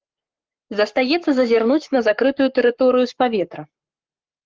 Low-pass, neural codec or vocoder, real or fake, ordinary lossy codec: 7.2 kHz; codec, 44.1 kHz, 7.8 kbps, Pupu-Codec; fake; Opus, 32 kbps